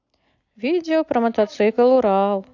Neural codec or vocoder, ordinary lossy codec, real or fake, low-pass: none; AAC, 48 kbps; real; 7.2 kHz